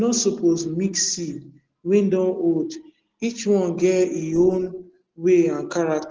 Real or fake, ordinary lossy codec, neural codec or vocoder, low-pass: real; Opus, 16 kbps; none; 7.2 kHz